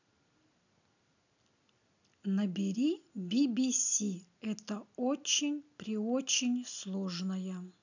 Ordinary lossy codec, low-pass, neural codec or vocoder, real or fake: none; 7.2 kHz; none; real